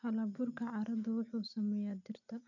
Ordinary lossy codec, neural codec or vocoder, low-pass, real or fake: none; none; 7.2 kHz; real